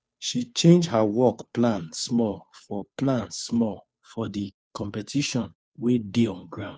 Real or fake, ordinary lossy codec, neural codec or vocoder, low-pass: fake; none; codec, 16 kHz, 2 kbps, FunCodec, trained on Chinese and English, 25 frames a second; none